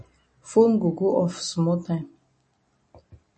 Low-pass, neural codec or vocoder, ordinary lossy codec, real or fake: 10.8 kHz; none; MP3, 32 kbps; real